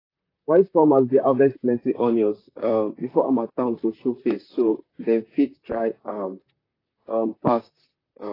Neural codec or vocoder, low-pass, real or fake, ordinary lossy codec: vocoder, 44.1 kHz, 128 mel bands, Pupu-Vocoder; 5.4 kHz; fake; AAC, 24 kbps